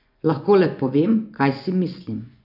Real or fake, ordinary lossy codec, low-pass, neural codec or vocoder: fake; none; 5.4 kHz; vocoder, 24 kHz, 100 mel bands, Vocos